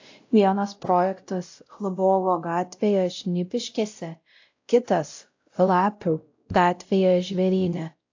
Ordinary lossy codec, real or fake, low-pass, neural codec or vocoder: AAC, 48 kbps; fake; 7.2 kHz; codec, 16 kHz, 0.5 kbps, X-Codec, WavLM features, trained on Multilingual LibriSpeech